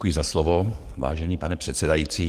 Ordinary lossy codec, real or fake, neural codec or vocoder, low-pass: Opus, 24 kbps; fake; codec, 44.1 kHz, 7.8 kbps, DAC; 14.4 kHz